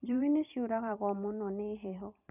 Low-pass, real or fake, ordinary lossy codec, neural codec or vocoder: 3.6 kHz; fake; none; vocoder, 44.1 kHz, 128 mel bands every 512 samples, BigVGAN v2